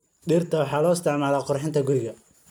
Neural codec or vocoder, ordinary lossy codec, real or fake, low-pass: none; none; real; none